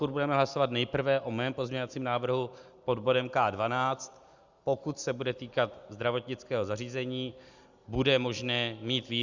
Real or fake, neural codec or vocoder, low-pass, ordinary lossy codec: real; none; 7.2 kHz; Opus, 64 kbps